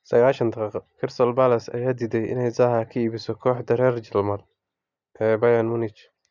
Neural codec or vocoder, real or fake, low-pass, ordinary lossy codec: none; real; 7.2 kHz; none